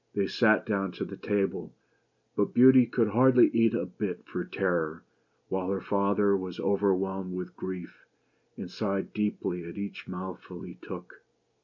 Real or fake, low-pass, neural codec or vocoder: real; 7.2 kHz; none